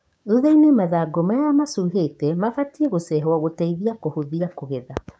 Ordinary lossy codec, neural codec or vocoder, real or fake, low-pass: none; codec, 16 kHz, 6 kbps, DAC; fake; none